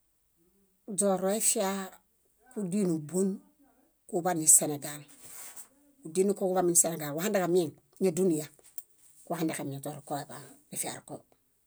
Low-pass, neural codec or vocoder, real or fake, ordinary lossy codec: none; none; real; none